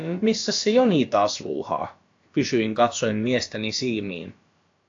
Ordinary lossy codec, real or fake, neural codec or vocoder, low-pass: AAC, 48 kbps; fake; codec, 16 kHz, about 1 kbps, DyCAST, with the encoder's durations; 7.2 kHz